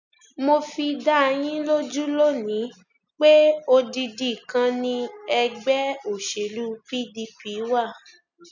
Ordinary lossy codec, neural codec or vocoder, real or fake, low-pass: none; none; real; 7.2 kHz